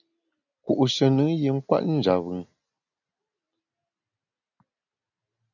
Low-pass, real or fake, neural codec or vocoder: 7.2 kHz; real; none